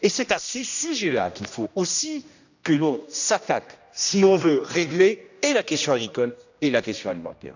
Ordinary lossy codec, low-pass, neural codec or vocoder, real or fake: none; 7.2 kHz; codec, 16 kHz, 1 kbps, X-Codec, HuBERT features, trained on general audio; fake